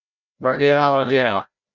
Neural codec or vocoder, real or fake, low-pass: codec, 16 kHz, 0.5 kbps, FreqCodec, larger model; fake; 7.2 kHz